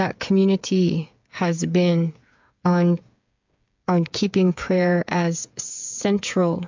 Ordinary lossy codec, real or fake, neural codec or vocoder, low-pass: MP3, 64 kbps; fake; codec, 16 kHz, 8 kbps, FreqCodec, smaller model; 7.2 kHz